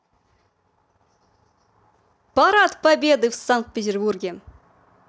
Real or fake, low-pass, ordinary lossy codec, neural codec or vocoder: real; none; none; none